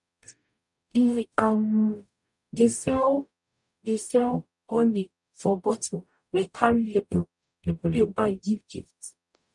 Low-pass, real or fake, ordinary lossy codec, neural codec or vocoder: 10.8 kHz; fake; none; codec, 44.1 kHz, 0.9 kbps, DAC